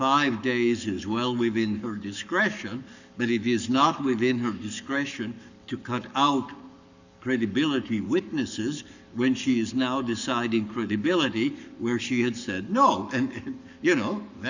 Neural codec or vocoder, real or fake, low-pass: codec, 44.1 kHz, 7.8 kbps, Pupu-Codec; fake; 7.2 kHz